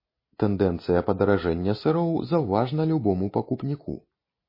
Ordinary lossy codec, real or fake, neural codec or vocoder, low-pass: MP3, 24 kbps; real; none; 5.4 kHz